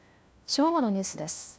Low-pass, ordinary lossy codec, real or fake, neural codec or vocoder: none; none; fake; codec, 16 kHz, 1 kbps, FunCodec, trained on LibriTTS, 50 frames a second